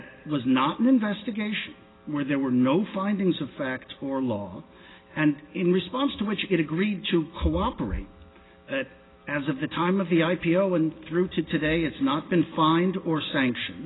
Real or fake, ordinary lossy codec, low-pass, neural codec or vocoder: real; AAC, 16 kbps; 7.2 kHz; none